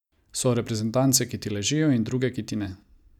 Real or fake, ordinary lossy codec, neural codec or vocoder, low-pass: real; none; none; 19.8 kHz